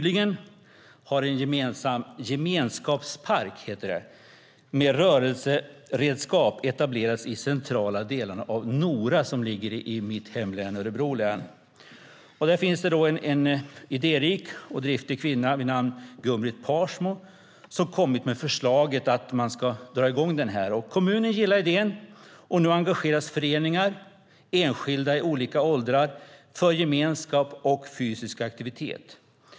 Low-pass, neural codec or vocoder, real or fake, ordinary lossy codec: none; none; real; none